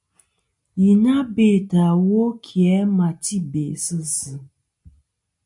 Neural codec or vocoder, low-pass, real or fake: none; 10.8 kHz; real